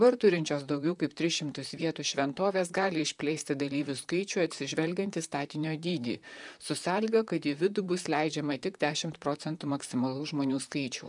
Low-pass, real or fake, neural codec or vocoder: 10.8 kHz; fake; vocoder, 44.1 kHz, 128 mel bands, Pupu-Vocoder